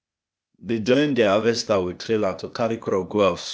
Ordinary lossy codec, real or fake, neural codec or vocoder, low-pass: none; fake; codec, 16 kHz, 0.8 kbps, ZipCodec; none